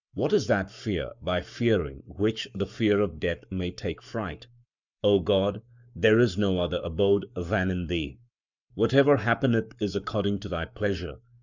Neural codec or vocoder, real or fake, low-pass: codec, 44.1 kHz, 7.8 kbps, Pupu-Codec; fake; 7.2 kHz